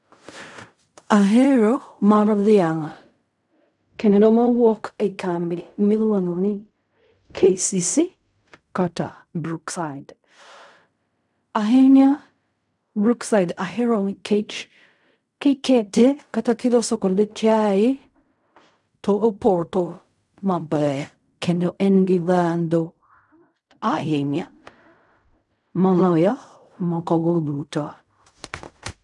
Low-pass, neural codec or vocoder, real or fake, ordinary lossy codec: 10.8 kHz; codec, 16 kHz in and 24 kHz out, 0.4 kbps, LongCat-Audio-Codec, fine tuned four codebook decoder; fake; none